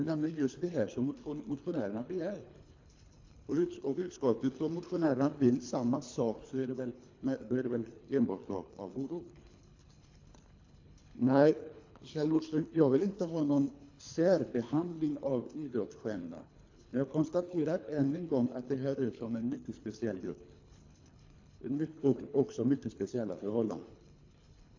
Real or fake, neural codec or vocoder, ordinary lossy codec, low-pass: fake; codec, 24 kHz, 3 kbps, HILCodec; AAC, 48 kbps; 7.2 kHz